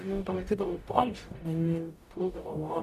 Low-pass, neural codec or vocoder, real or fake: 14.4 kHz; codec, 44.1 kHz, 0.9 kbps, DAC; fake